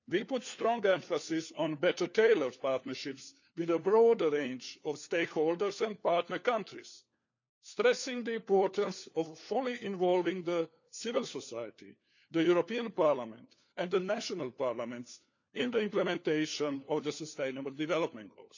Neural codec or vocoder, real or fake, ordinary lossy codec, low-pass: codec, 16 kHz, 4 kbps, FunCodec, trained on LibriTTS, 50 frames a second; fake; none; 7.2 kHz